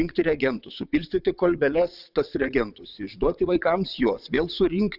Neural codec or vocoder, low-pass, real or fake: vocoder, 22.05 kHz, 80 mel bands, WaveNeXt; 5.4 kHz; fake